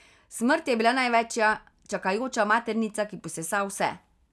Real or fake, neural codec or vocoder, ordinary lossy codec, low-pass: real; none; none; none